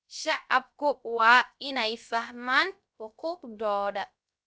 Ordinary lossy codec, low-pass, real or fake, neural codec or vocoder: none; none; fake; codec, 16 kHz, 0.3 kbps, FocalCodec